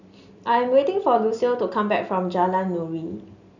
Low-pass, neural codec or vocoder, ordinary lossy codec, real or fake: 7.2 kHz; none; none; real